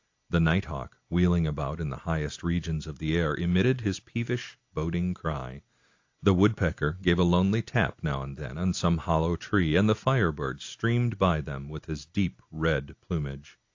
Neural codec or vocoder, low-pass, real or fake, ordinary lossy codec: none; 7.2 kHz; real; AAC, 48 kbps